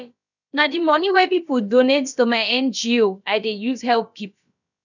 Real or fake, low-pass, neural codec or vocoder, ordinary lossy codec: fake; 7.2 kHz; codec, 16 kHz, about 1 kbps, DyCAST, with the encoder's durations; none